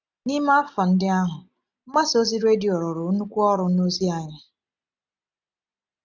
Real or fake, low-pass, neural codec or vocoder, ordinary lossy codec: real; 7.2 kHz; none; none